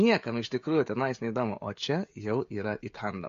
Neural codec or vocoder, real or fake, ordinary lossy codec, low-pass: codec, 16 kHz, 16 kbps, FreqCodec, smaller model; fake; MP3, 48 kbps; 7.2 kHz